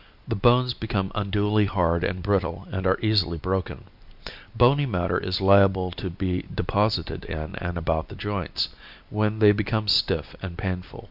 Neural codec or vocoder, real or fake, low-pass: none; real; 5.4 kHz